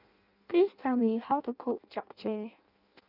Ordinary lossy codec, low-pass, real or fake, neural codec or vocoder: none; 5.4 kHz; fake; codec, 16 kHz in and 24 kHz out, 0.6 kbps, FireRedTTS-2 codec